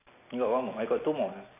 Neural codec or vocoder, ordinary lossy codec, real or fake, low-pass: none; none; real; 3.6 kHz